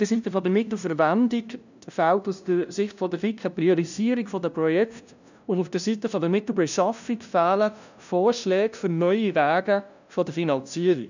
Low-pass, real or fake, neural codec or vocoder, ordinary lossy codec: 7.2 kHz; fake; codec, 16 kHz, 0.5 kbps, FunCodec, trained on LibriTTS, 25 frames a second; none